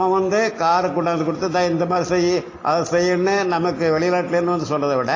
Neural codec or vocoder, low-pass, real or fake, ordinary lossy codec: none; 7.2 kHz; real; AAC, 32 kbps